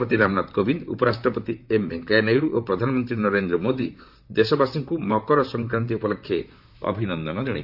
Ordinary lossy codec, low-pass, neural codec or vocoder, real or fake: AAC, 48 kbps; 5.4 kHz; vocoder, 44.1 kHz, 128 mel bands, Pupu-Vocoder; fake